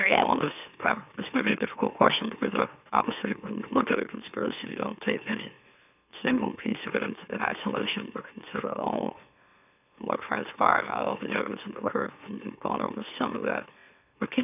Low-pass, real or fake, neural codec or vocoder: 3.6 kHz; fake; autoencoder, 44.1 kHz, a latent of 192 numbers a frame, MeloTTS